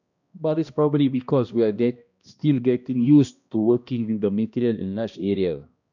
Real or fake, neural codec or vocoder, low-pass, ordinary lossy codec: fake; codec, 16 kHz, 1 kbps, X-Codec, HuBERT features, trained on balanced general audio; 7.2 kHz; none